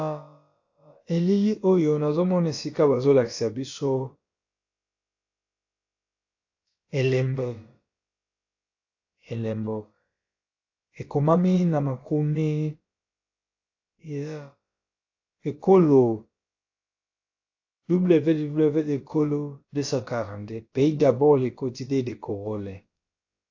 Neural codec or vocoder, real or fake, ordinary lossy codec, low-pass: codec, 16 kHz, about 1 kbps, DyCAST, with the encoder's durations; fake; AAC, 48 kbps; 7.2 kHz